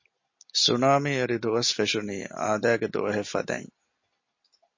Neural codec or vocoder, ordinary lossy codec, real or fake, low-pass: none; MP3, 32 kbps; real; 7.2 kHz